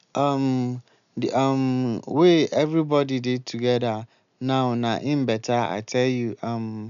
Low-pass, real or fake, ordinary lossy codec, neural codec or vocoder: 7.2 kHz; real; none; none